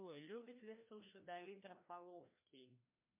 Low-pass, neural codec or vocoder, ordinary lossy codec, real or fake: 3.6 kHz; codec, 16 kHz, 1 kbps, FreqCodec, larger model; MP3, 32 kbps; fake